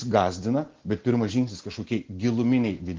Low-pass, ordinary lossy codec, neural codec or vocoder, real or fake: 7.2 kHz; Opus, 16 kbps; none; real